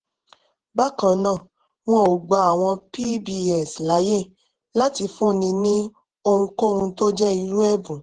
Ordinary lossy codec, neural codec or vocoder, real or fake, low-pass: Opus, 16 kbps; vocoder, 48 kHz, 128 mel bands, Vocos; fake; 9.9 kHz